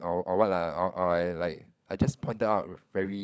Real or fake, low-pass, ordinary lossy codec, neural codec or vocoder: fake; none; none; codec, 16 kHz, 16 kbps, FunCodec, trained on LibriTTS, 50 frames a second